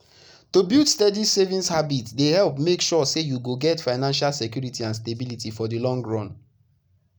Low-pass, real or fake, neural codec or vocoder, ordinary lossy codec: none; real; none; none